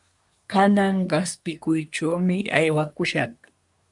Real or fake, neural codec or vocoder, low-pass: fake; codec, 24 kHz, 1 kbps, SNAC; 10.8 kHz